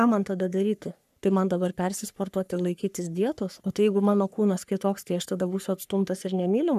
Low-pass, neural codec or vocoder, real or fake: 14.4 kHz; codec, 44.1 kHz, 3.4 kbps, Pupu-Codec; fake